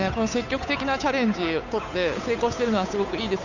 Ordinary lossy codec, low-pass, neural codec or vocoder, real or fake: none; 7.2 kHz; codec, 16 kHz, 8 kbps, FunCodec, trained on Chinese and English, 25 frames a second; fake